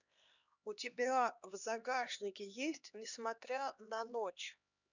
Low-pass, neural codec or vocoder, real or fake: 7.2 kHz; codec, 16 kHz, 2 kbps, X-Codec, HuBERT features, trained on LibriSpeech; fake